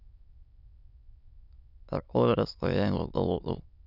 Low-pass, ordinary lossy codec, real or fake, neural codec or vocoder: 5.4 kHz; none; fake; autoencoder, 22.05 kHz, a latent of 192 numbers a frame, VITS, trained on many speakers